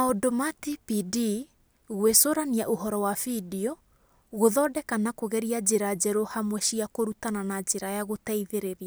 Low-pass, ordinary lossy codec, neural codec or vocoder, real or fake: none; none; none; real